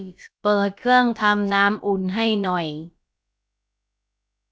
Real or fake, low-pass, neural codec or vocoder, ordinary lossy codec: fake; none; codec, 16 kHz, about 1 kbps, DyCAST, with the encoder's durations; none